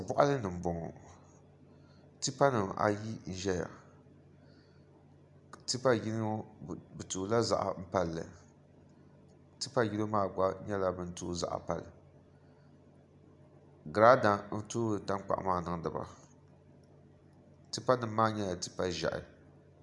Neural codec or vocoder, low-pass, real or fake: none; 10.8 kHz; real